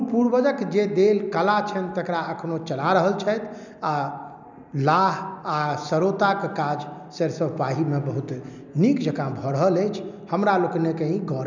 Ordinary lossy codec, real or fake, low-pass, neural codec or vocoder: none; real; 7.2 kHz; none